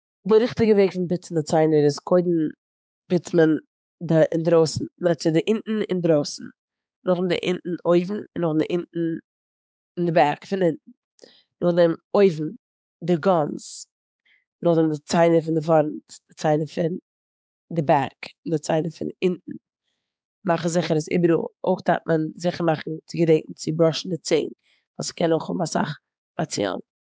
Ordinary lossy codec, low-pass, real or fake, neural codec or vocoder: none; none; fake; codec, 16 kHz, 4 kbps, X-Codec, HuBERT features, trained on balanced general audio